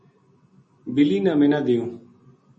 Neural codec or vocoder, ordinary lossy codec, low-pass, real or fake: none; MP3, 32 kbps; 10.8 kHz; real